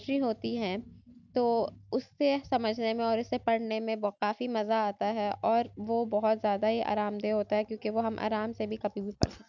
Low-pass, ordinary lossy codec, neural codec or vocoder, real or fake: 7.2 kHz; none; none; real